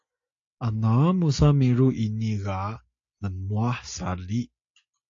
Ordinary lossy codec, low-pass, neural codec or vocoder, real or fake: AAC, 48 kbps; 7.2 kHz; none; real